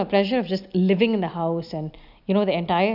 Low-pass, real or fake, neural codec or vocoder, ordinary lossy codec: 5.4 kHz; real; none; none